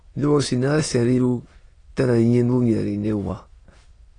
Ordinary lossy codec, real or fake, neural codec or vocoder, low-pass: AAC, 32 kbps; fake; autoencoder, 22.05 kHz, a latent of 192 numbers a frame, VITS, trained on many speakers; 9.9 kHz